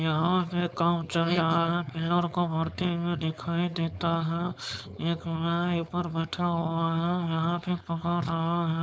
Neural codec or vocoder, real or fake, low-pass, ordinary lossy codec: codec, 16 kHz, 4.8 kbps, FACodec; fake; none; none